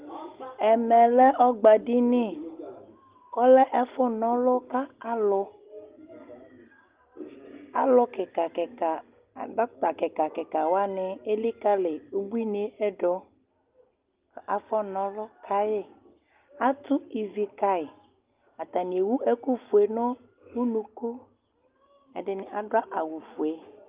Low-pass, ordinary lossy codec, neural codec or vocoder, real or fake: 3.6 kHz; Opus, 32 kbps; none; real